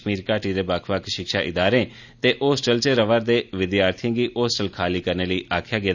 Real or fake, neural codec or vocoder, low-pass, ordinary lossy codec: real; none; 7.2 kHz; none